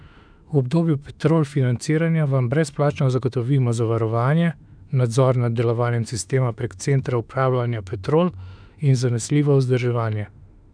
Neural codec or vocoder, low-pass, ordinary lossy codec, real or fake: autoencoder, 48 kHz, 32 numbers a frame, DAC-VAE, trained on Japanese speech; 9.9 kHz; none; fake